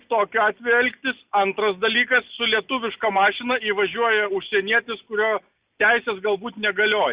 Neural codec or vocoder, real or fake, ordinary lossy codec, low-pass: none; real; Opus, 64 kbps; 3.6 kHz